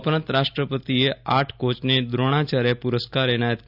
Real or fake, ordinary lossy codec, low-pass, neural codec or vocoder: real; none; 5.4 kHz; none